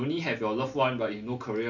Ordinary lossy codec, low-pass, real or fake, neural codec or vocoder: none; 7.2 kHz; real; none